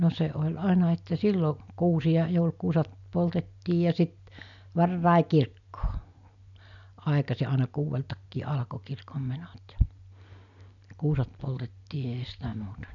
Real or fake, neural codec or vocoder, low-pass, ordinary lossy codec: real; none; 7.2 kHz; none